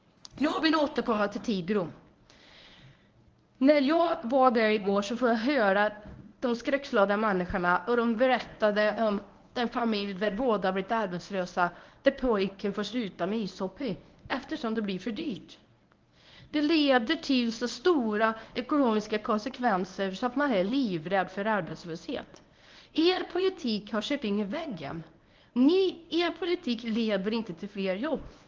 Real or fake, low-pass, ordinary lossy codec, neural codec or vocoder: fake; 7.2 kHz; Opus, 24 kbps; codec, 24 kHz, 0.9 kbps, WavTokenizer, medium speech release version 1